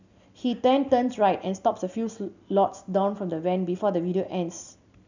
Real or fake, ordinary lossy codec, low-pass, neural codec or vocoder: real; none; 7.2 kHz; none